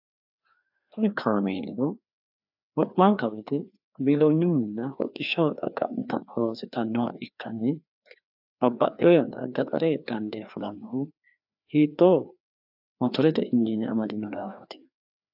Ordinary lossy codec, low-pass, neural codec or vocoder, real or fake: AAC, 48 kbps; 5.4 kHz; codec, 16 kHz, 2 kbps, FreqCodec, larger model; fake